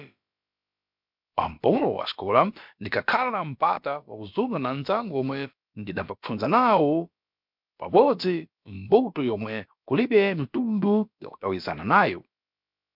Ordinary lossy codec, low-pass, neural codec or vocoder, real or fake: MP3, 48 kbps; 5.4 kHz; codec, 16 kHz, about 1 kbps, DyCAST, with the encoder's durations; fake